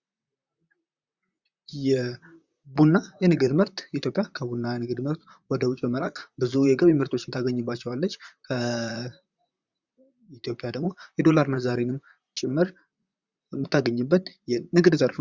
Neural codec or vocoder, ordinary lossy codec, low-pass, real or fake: vocoder, 44.1 kHz, 128 mel bands, Pupu-Vocoder; Opus, 64 kbps; 7.2 kHz; fake